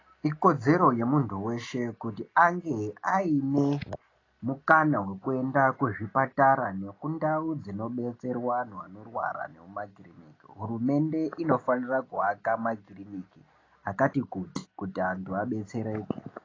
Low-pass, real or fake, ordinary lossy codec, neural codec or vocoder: 7.2 kHz; real; AAC, 32 kbps; none